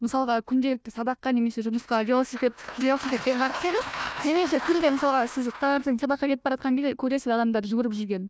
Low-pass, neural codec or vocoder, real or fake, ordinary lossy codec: none; codec, 16 kHz, 1 kbps, FunCodec, trained on Chinese and English, 50 frames a second; fake; none